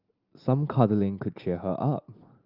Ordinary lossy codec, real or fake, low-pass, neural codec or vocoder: Opus, 32 kbps; real; 5.4 kHz; none